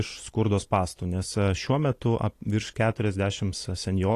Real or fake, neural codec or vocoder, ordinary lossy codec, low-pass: real; none; AAC, 48 kbps; 14.4 kHz